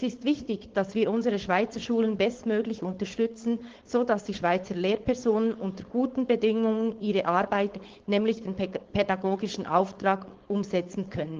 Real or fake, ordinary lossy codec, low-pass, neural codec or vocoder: fake; Opus, 16 kbps; 7.2 kHz; codec, 16 kHz, 4.8 kbps, FACodec